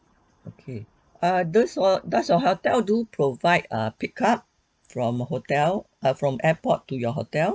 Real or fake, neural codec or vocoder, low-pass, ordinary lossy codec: real; none; none; none